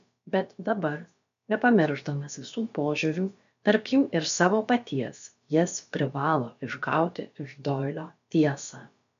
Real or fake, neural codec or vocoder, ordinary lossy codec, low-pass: fake; codec, 16 kHz, about 1 kbps, DyCAST, with the encoder's durations; AAC, 96 kbps; 7.2 kHz